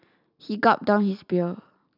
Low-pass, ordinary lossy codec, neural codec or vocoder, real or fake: 5.4 kHz; none; none; real